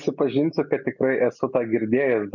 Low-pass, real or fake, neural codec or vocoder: 7.2 kHz; real; none